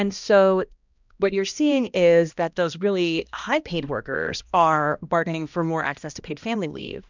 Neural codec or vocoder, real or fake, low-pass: codec, 16 kHz, 1 kbps, X-Codec, HuBERT features, trained on balanced general audio; fake; 7.2 kHz